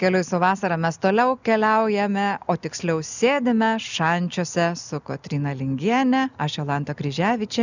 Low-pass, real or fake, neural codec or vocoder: 7.2 kHz; real; none